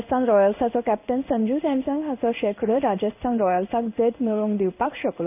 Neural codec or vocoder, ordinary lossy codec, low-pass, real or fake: codec, 16 kHz in and 24 kHz out, 1 kbps, XY-Tokenizer; none; 3.6 kHz; fake